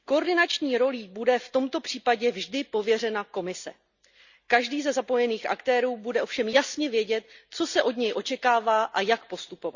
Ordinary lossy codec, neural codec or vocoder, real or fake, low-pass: Opus, 64 kbps; none; real; 7.2 kHz